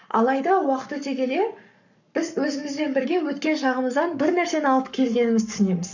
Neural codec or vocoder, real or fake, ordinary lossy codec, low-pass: codec, 44.1 kHz, 7.8 kbps, Pupu-Codec; fake; none; 7.2 kHz